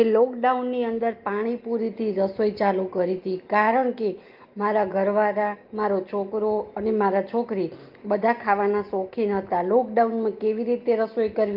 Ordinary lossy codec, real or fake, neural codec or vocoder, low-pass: Opus, 32 kbps; real; none; 5.4 kHz